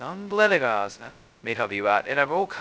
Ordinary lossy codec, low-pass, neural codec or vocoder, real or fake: none; none; codec, 16 kHz, 0.2 kbps, FocalCodec; fake